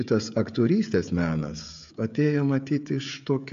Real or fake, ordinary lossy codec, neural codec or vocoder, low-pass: fake; AAC, 64 kbps; codec, 16 kHz, 16 kbps, FreqCodec, smaller model; 7.2 kHz